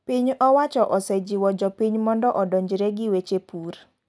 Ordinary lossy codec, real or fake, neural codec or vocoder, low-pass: none; real; none; none